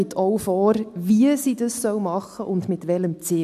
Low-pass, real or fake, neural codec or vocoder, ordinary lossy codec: 14.4 kHz; real; none; AAC, 96 kbps